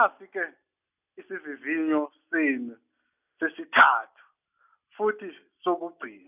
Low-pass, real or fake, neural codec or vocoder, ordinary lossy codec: 3.6 kHz; real; none; none